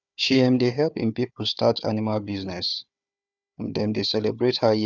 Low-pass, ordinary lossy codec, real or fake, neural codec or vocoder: 7.2 kHz; none; fake; codec, 16 kHz, 4 kbps, FunCodec, trained on Chinese and English, 50 frames a second